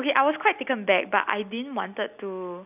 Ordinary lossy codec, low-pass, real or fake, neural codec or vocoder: none; 3.6 kHz; real; none